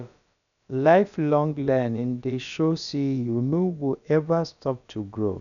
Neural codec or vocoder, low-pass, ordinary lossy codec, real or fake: codec, 16 kHz, about 1 kbps, DyCAST, with the encoder's durations; 7.2 kHz; none; fake